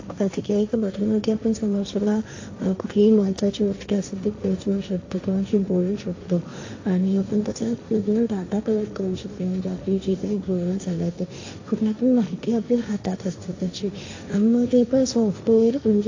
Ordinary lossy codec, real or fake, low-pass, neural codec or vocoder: AAC, 48 kbps; fake; 7.2 kHz; codec, 16 kHz, 1.1 kbps, Voila-Tokenizer